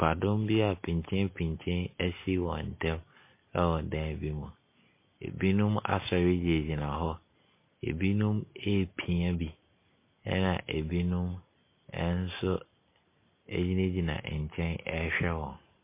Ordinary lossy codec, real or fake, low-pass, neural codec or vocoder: MP3, 24 kbps; real; 3.6 kHz; none